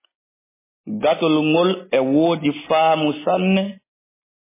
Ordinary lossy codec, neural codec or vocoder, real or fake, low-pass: MP3, 16 kbps; none; real; 3.6 kHz